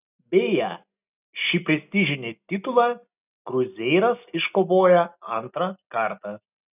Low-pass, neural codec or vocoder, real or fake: 3.6 kHz; none; real